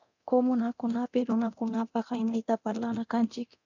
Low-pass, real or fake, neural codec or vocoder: 7.2 kHz; fake; codec, 24 kHz, 0.9 kbps, DualCodec